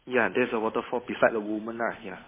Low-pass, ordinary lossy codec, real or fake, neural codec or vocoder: 3.6 kHz; MP3, 16 kbps; fake; codec, 16 kHz, 8 kbps, FunCodec, trained on Chinese and English, 25 frames a second